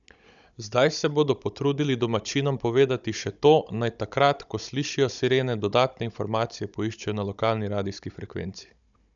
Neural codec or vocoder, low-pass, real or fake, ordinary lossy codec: codec, 16 kHz, 16 kbps, FunCodec, trained on Chinese and English, 50 frames a second; 7.2 kHz; fake; none